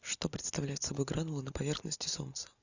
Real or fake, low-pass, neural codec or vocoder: real; 7.2 kHz; none